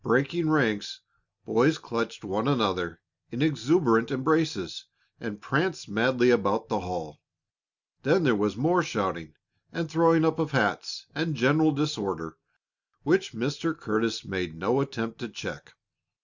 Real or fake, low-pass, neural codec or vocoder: real; 7.2 kHz; none